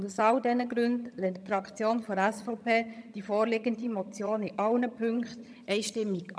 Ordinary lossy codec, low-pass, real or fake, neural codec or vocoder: none; none; fake; vocoder, 22.05 kHz, 80 mel bands, HiFi-GAN